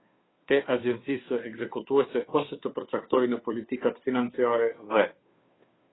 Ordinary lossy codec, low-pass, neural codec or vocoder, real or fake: AAC, 16 kbps; 7.2 kHz; codec, 16 kHz, 2 kbps, FunCodec, trained on Chinese and English, 25 frames a second; fake